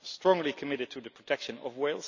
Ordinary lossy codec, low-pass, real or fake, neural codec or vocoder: none; 7.2 kHz; real; none